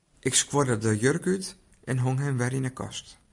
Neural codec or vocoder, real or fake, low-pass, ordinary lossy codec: none; real; 10.8 kHz; MP3, 96 kbps